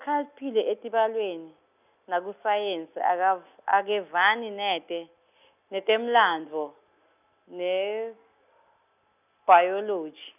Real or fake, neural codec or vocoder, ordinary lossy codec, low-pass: real; none; none; 3.6 kHz